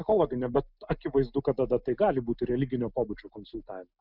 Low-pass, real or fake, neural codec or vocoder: 5.4 kHz; real; none